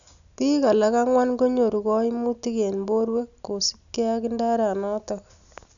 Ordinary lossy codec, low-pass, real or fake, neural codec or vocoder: none; 7.2 kHz; real; none